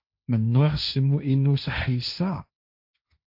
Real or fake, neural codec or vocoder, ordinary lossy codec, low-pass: fake; codec, 16 kHz, 1.1 kbps, Voila-Tokenizer; AAC, 48 kbps; 5.4 kHz